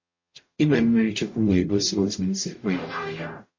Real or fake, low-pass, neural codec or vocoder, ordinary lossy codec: fake; 7.2 kHz; codec, 44.1 kHz, 0.9 kbps, DAC; MP3, 32 kbps